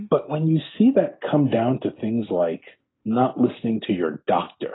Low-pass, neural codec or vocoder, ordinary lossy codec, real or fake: 7.2 kHz; none; AAC, 16 kbps; real